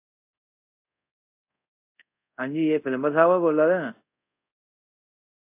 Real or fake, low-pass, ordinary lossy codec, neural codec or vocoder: fake; 3.6 kHz; AAC, 24 kbps; codec, 24 kHz, 0.5 kbps, DualCodec